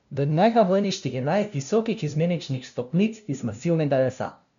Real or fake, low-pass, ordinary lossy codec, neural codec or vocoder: fake; 7.2 kHz; none; codec, 16 kHz, 0.5 kbps, FunCodec, trained on LibriTTS, 25 frames a second